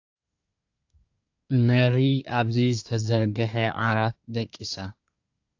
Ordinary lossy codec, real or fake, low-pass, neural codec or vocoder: AAC, 48 kbps; fake; 7.2 kHz; codec, 24 kHz, 1 kbps, SNAC